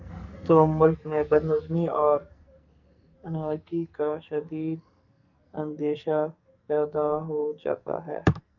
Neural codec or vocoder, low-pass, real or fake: codec, 44.1 kHz, 2.6 kbps, SNAC; 7.2 kHz; fake